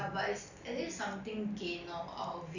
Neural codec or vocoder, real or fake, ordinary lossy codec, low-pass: none; real; none; 7.2 kHz